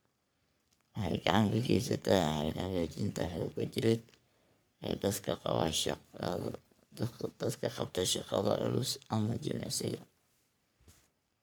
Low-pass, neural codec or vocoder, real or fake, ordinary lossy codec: none; codec, 44.1 kHz, 3.4 kbps, Pupu-Codec; fake; none